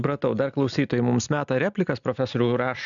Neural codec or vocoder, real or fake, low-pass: none; real; 7.2 kHz